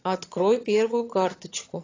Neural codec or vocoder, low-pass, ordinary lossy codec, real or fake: vocoder, 22.05 kHz, 80 mel bands, HiFi-GAN; 7.2 kHz; AAC, 32 kbps; fake